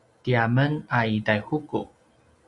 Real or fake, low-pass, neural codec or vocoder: real; 10.8 kHz; none